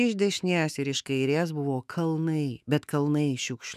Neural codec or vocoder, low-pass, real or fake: autoencoder, 48 kHz, 128 numbers a frame, DAC-VAE, trained on Japanese speech; 14.4 kHz; fake